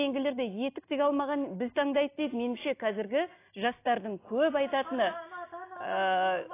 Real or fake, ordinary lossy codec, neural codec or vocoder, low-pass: real; AAC, 24 kbps; none; 3.6 kHz